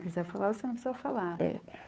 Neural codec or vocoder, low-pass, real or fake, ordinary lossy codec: codec, 16 kHz, 2 kbps, FunCodec, trained on Chinese and English, 25 frames a second; none; fake; none